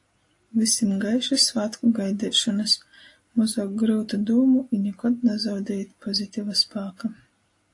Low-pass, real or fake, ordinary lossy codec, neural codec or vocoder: 10.8 kHz; real; AAC, 48 kbps; none